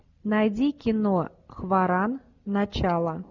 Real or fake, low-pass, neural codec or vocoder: real; 7.2 kHz; none